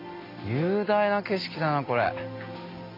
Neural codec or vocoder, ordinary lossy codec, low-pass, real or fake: none; AAC, 32 kbps; 5.4 kHz; real